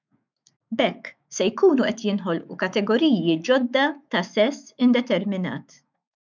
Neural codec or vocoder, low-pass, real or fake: autoencoder, 48 kHz, 128 numbers a frame, DAC-VAE, trained on Japanese speech; 7.2 kHz; fake